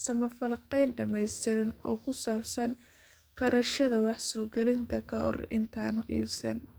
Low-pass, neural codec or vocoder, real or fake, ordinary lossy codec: none; codec, 44.1 kHz, 2.6 kbps, SNAC; fake; none